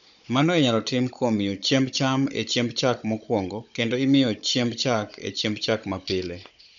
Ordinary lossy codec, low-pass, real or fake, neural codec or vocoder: none; 7.2 kHz; fake; codec, 16 kHz, 16 kbps, FunCodec, trained on Chinese and English, 50 frames a second